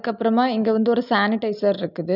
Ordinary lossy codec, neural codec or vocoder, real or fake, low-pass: none; none; real; 5.4 kHz